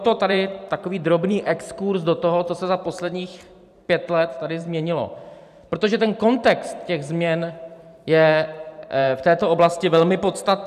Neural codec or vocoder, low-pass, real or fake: vocoder, 44.1 kHz, 128 mel bands every 512 samples, BigVGAN v2; 14.4 kHz; fake